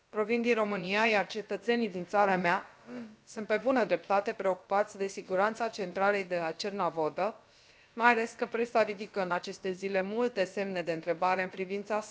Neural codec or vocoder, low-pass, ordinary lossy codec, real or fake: codec, 16 kHz, about 1 kbps, DyCAST, with the encoder's durations; none; none; fake